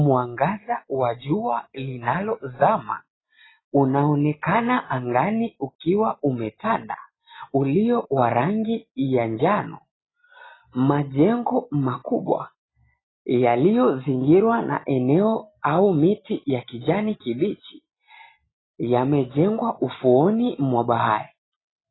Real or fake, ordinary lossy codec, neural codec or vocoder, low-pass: real; AAC, 16 kbps; none; 7.2 kHz